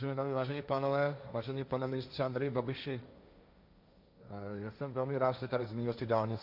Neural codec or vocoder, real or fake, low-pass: codec, 16 kHz, 1.1 kbps, Voila-Tokenizer; fake; 5.4 kHz